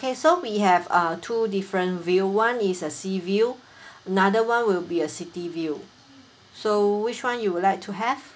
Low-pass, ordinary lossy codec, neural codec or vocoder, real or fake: none; none; none; real